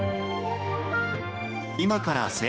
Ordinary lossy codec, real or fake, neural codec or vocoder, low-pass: none; fake; codec, 16 kHz, 2 kbps, X-Codec, HuBERT features, trained on general audio; none